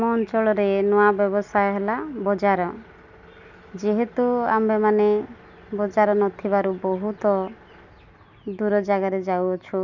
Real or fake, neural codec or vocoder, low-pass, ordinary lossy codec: real; none; 7.2 kHz; none